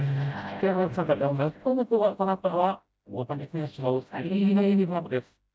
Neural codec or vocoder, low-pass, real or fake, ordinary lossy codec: codec, 16 kHz, 0.5 kbps, FreqCodec, smaller model; none; fake; none